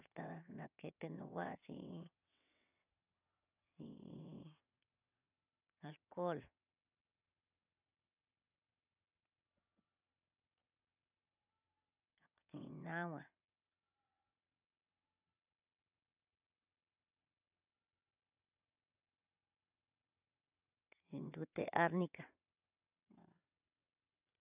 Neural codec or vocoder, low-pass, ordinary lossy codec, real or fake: vocoder, 22.05 kHz, 80 mel bands, WaveNeXt; 3.6 kHz; none; fake